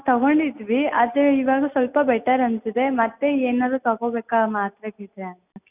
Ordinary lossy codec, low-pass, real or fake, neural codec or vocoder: none; 3.6 kHz; real; none